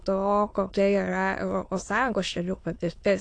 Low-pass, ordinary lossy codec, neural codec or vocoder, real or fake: 9.9 kHz; AAC, 48 kbps; autoencoder, 22.05 kHz, a latent of 192 numbers a frame, VITS, trained on many speakers; fake